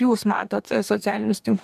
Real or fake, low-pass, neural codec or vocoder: fake; 14.4 kHz; codec, 44.1 kHz, 2.6 kbps, DAC